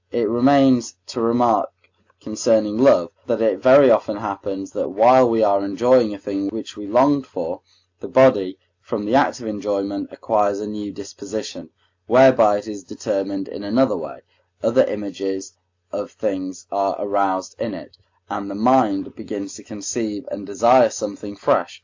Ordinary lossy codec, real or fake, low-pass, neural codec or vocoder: AAC, 48 kbps; real; 7.2 kHz; none